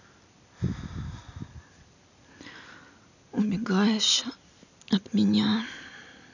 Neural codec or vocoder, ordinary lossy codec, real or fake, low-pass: none; none; real; 7.2 kHz